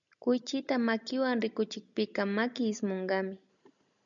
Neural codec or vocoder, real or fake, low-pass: none; real; 7.2 kHz